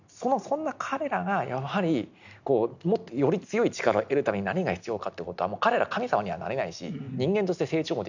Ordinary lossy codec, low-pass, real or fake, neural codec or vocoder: none; 7.2 kHz; real; none